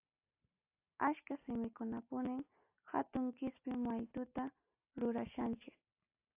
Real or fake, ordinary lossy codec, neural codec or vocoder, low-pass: real; AAC, 32 kbps; none; 3.6 kHz